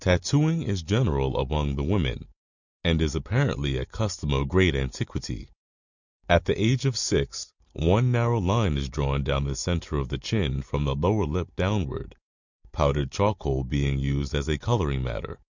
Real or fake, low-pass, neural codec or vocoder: real; 7.2 kHz; none